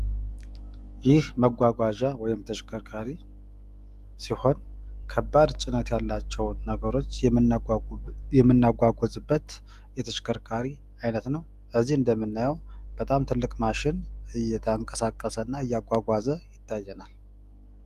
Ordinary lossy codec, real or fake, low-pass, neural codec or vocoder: Opus, 32 kbps; fake; 14.4 kHz; autoencoder, 48 kHz, 128 numbers a frame, DAC-VAE, trained on Japanese speech